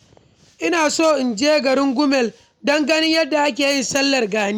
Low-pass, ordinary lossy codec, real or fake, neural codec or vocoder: 19.8 kHz; none; real; none